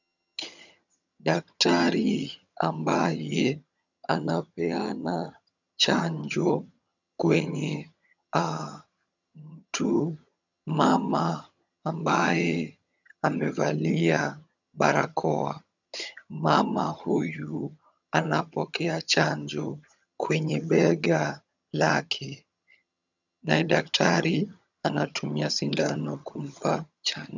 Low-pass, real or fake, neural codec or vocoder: 7.2 kHz; fake; vocoder, 22.05 kHz, 80 mel bands, HiFi-GAN